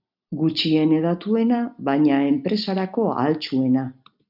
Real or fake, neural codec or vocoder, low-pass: real; none; 5.4 kHz